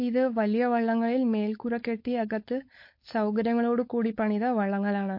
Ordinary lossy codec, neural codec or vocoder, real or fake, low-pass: MP3, 32 kbps; codec, 16 kHz, 4.8 kbps, FACodec; fake; 5.4 kHz